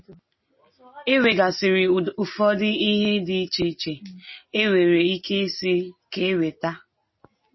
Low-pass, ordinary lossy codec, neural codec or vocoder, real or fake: 7.2 kHz; MP3, 24 kbps; none; real